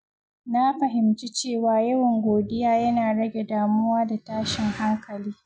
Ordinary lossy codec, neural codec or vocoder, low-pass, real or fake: none; none; none; real